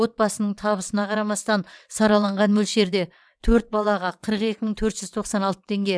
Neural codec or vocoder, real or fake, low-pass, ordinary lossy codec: vocoder, 22.05 kHz, 80 mel bands, WaveNeXt; fake; none; none